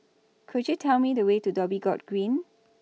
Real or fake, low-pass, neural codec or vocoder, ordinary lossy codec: real; none; none; none